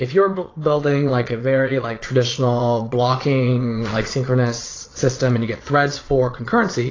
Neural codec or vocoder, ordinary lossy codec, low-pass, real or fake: vocoder, 22.05 kHz, 80 mel bands, Vocos; AAC, 32 kbps; 7.2 kHz; fake